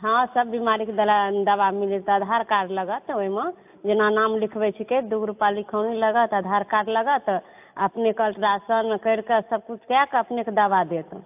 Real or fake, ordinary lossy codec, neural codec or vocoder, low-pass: real; AAC, 32 kbps; none; 3.6 kHz